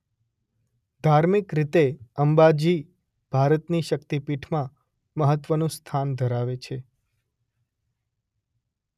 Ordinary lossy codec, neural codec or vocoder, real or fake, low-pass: none; none; real; 14.4 kHz